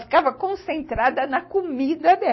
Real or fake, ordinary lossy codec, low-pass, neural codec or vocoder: real; MP3, 24 kbps; 7.2 kHz; none